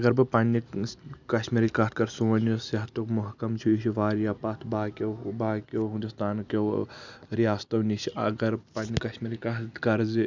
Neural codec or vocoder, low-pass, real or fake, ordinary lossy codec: none; 7.2 kHz; real; none